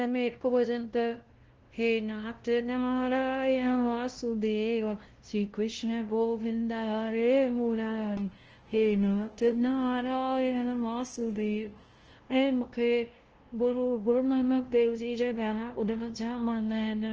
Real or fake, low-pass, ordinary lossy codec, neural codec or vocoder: fake; 7.2 kHz; Opus, 16 kbps; codec, 16 kHz, 0.5 kbps, FunCodec, trained on LibriTTS, 25 frames a second